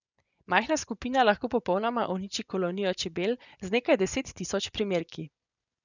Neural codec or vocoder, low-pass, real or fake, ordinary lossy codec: vocoder, 24 kHz, 100 mel bands, Vocos; 7.2 kHz; fake; none